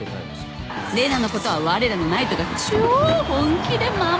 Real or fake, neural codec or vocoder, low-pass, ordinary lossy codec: real; none; none; none